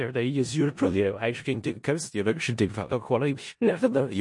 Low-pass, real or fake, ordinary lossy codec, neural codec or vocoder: 10.8 kHz; fake; MP3, 48 kbps; codec, 16 kHz in and 24 kHz out, 0.4 kbps, LongCat-Audio-Codec, four codebook decoder